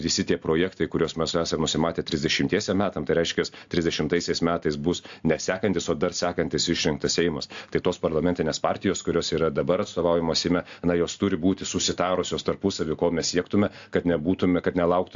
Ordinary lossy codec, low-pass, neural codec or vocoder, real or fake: AAC, 64 kbps; 7.2 kHz; none; real